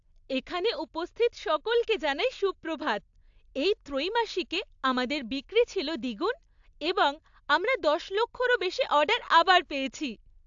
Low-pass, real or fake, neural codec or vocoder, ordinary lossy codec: 7.2 kHz; real; none; AAC, 64 kbps